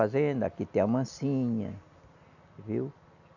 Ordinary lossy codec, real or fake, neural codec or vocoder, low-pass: none; real; none; 7.2 kHz